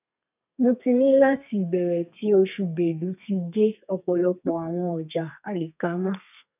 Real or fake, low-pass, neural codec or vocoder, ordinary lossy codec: fake; 3.6 kHz; codec, 32 kHz, 1.9 kbps, SNAC; none